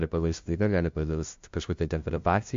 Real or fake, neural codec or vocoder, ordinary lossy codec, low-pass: fake; codec, 16 kHz, 0.5 kbps, FunCodec, trained on LibriTTS, 25 frames a second; MP3, 48 kbps; 7.2 kHz